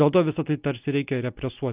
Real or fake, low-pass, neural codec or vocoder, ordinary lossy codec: real; 3.6 kHz; none; Opus, 32 kbps